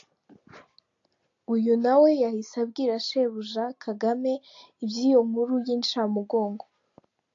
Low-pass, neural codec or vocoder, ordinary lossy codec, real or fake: 7.2 kHz; none; AAC, 48 kbps; real